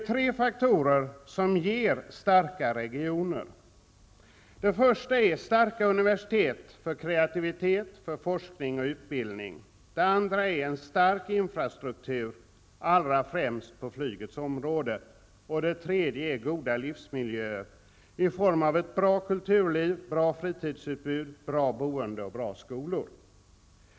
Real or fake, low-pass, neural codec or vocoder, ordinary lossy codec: real; none; none; none